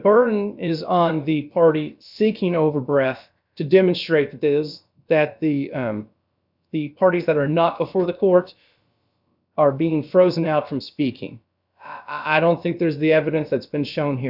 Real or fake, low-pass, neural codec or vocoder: fake; 5.4 kHz; codec, 16 kHz, about 1 kbps, DyCAST, with the encoder's durations